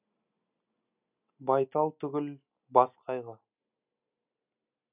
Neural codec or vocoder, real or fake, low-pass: none; real; 3.6 kHz